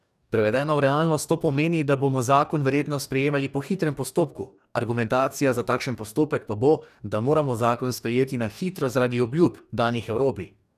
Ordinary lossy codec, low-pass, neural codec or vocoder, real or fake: none; 14.4 kHz; codec, 44.1 kHz, 2.6 kbps, DAC; fake